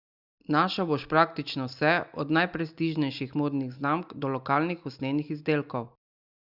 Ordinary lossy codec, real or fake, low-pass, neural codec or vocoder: Opus, 64 kbps; real; 5.4 kHz; none